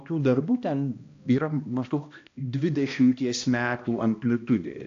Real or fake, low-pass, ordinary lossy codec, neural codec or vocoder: fake; 7.2 kHz; AAC, 48 kbps; codec, 16 kHz, 1 kbps, X-Codec, HuBERT features, trained on balanced general audio